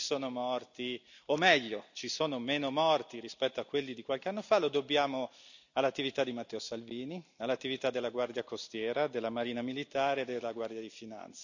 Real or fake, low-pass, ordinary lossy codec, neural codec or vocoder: real; 7.2 kHz; none; none